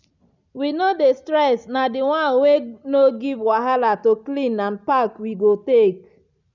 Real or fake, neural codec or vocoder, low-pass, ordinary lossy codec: real; none; 7.2 kHz; none